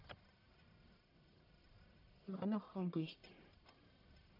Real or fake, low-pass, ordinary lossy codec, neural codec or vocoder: fake; 5.4 kHz; none; codec, 44.1 kHz, 1.7 kbps, Pupu-Codec